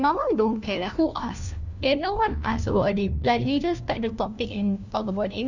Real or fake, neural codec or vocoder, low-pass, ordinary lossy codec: fake; codec, 16 kHz, 1 kbps, FunCodec, trained on LibriTTS, 50 frames a second; 7.2 kHz; none